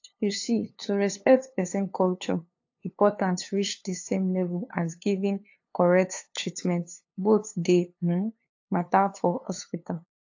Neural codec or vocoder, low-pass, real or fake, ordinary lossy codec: codec, 16 kHz, 2 kbps, FunCodec, trained on LibriTTS, 25 frames a second; 7.2 kHz; fake; AAC, 48 kbps